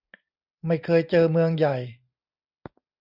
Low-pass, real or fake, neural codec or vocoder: 5.4 kHz; real; none